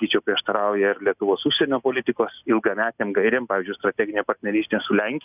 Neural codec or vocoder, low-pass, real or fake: none; 3.6 kHz; real